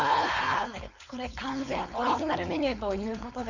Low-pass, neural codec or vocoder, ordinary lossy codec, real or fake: 7.2 kHz; codec, 16 kHz, 4.8 kbps, FACodec; none; fake